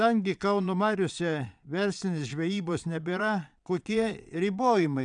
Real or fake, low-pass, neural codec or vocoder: fake; 9.9 kHz; vocoder, 22.05 kHz, 80 mel bands, Vocos